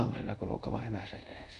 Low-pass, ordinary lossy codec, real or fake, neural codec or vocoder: 10.8 kHz; none; fake; codec, 24 kHz, 0.5 kbps, DualCodec